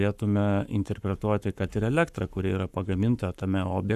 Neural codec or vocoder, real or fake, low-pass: codec, 44.1 kHz, 7.8 kbps, Pupu-Codec; fake; 14.4 kHz